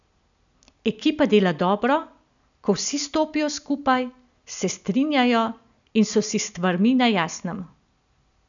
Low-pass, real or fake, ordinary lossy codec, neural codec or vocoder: 7.2 kHz; real; none; none